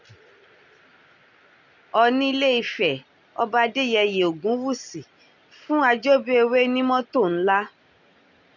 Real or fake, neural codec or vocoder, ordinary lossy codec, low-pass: real; none; none; 7.2 kHz